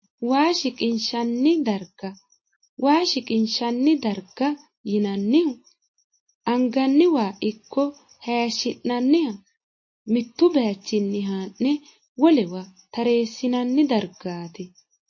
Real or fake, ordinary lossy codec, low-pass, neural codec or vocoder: real; MP3, 32 kbps; 7.2 kHz; none